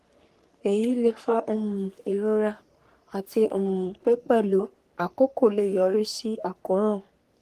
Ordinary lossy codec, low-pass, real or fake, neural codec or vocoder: Opus, 24 kbps; 14.4 kHz; fake; codec, 44.1 kHz, 3.4 kbps, Pupu-Codec